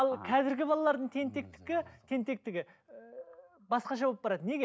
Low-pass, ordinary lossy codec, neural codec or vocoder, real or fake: none; none; none; real